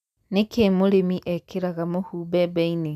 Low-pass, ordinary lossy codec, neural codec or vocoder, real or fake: 14.4 kHz; none; none; real